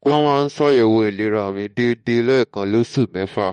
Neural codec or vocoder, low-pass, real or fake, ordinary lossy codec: autoencoder, 48 kHz, 32 numbers a frame, DAC-VAE, trained on Japanese speech; 19.8 kHz; fake; MP3, 48 kbps